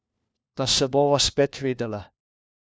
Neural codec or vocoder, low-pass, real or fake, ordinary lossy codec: codec, 16 kHz, 1 kbps, FunCodec, trained on LibriTTS, 50 frames a second; none; fake; none